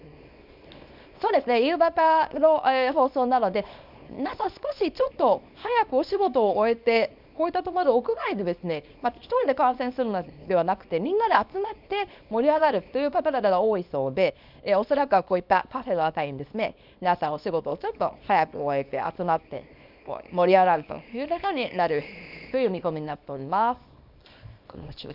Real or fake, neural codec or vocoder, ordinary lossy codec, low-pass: fake; codec, 24 kHz, 0.9 kbps, WavTokenizer, small release; none; 5.4 kHz